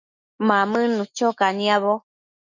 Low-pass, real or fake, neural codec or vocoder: 7.2 kHz; fake; autoencoder, 48 kHz, 128 numbers a frame, DAC-VAE, trained on Japanese speech